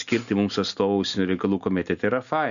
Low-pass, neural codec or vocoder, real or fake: 7.2 kHz; none; real